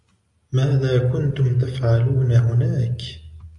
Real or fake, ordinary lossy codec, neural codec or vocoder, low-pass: real; AAC, 64 kbps; none; 10.8 kHz